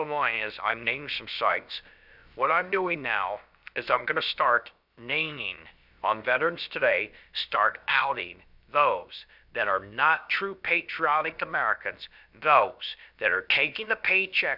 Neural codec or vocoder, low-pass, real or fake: codec, 16 kHz, about 1 kbps, DyCAST, with the encoder's durations; 5.4 kHz; fake